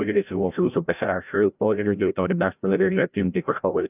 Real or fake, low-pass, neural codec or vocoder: fake; 3.6 kHz; codec, 16 kHz, 0.5 kbps, FreqCodec, larger model